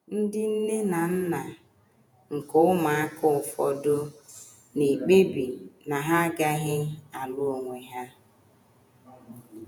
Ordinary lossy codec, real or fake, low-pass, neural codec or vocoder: none; fake; none; vocoder, 48 kHz, 128 mel bands, Vocos